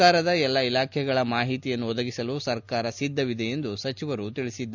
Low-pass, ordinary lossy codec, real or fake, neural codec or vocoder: 7.2 kHz; none; real; none